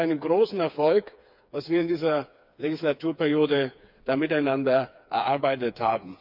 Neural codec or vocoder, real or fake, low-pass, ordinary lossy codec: codec, 16 kHz, 4 kbps, FreqCodec, smaller model; fake; 5.4 kHz; none